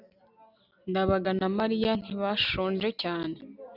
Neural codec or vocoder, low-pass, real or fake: none; 5.4 kHz; real